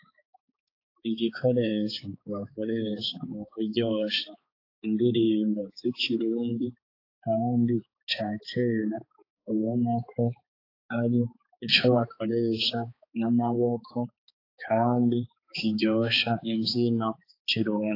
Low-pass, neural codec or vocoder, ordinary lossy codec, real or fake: 5.4 kHz; codec, 16 kHz, 4 kbps, X-Codec, HuBERT features, trained on balanced general audio; AAC, 32 kbps; fake